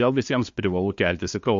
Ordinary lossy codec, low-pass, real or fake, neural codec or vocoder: MP3, 48 kbps; 7.2 kHz; fake; codec, 16 kHz, 2 kbps, FunCodec, trained on LibriTTS, 25 frames a second